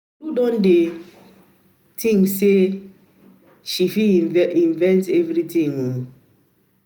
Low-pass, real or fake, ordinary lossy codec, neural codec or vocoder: none; real; none; none